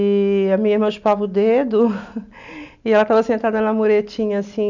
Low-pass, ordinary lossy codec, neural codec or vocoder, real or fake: 7.2 kHz; none; none; real